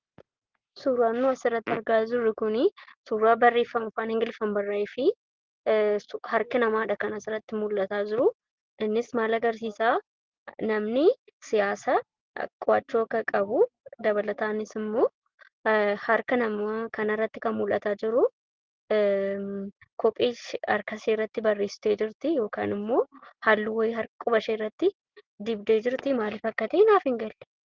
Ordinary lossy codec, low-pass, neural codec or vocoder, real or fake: Opus, 16 kbps; 7.2 kHz; none; real